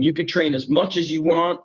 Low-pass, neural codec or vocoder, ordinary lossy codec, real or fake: 7.2 kHz; codec, 16 kHz, 2 kbps, FunCodec, trained on Chinese and English, 25 frames a second; Opus, 64 kbps; fake